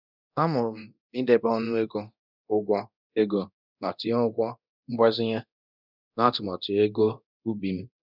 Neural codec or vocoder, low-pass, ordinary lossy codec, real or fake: codec, 24 kHz, 0.9 kbps, DualCodec; 5.4 kHz; none; fake